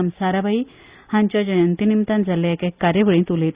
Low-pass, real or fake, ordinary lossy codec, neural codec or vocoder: 3.6 kHz; real; Opus, 64 kbps; none